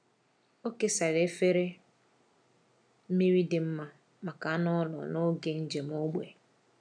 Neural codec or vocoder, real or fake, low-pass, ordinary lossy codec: none; real; 9.9 kHz; none